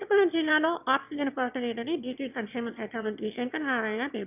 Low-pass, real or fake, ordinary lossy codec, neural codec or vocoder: 3.6 kHz; fake; none; autoencoder, 22.05 kHz, a latent of 192 numbers a frame, VITS, trained on one speaker